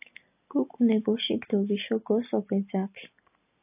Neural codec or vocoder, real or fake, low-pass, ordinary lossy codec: none; real; 3.6 kHz; AAC, 24 kbps